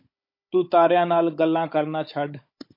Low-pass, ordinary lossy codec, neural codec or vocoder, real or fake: 5.4 kHz; MP3, 32 kbps; codec, 16 kHz, 16 kbps, FunCodec, trained on Chinese and English, 50 frames a second; fake